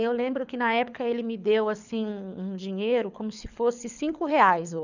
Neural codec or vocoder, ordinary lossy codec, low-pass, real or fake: codec, 24 kHz, 6 kbps, HILCodec; none; 7.2 kHz; fake